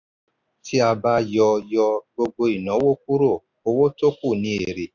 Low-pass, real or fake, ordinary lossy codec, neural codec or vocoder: 7.2 kHz; real; none; none